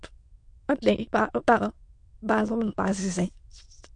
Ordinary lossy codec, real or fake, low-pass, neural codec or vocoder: MP3, 48 kbps; fake; 9.9 kHz; autoencoder, 22.05 kHz, a latent of 192 numbers a frame, VITS, trained on many speakers